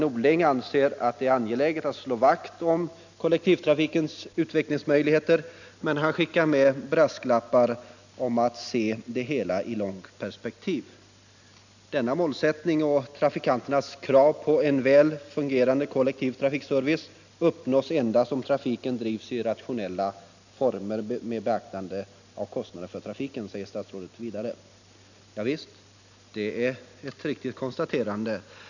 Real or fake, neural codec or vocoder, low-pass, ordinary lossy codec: real; none; 7.2 kHz; none